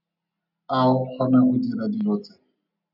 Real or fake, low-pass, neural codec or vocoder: real; 5.4 kHz; none